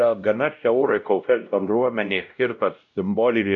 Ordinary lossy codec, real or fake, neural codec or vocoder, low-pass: MP3, 96 kbps; fake; codec, 16 kHz, 0.5 kbps, X-Codec, WavLM features, trained on Multilingual LibriSpeech; 7.2 kHz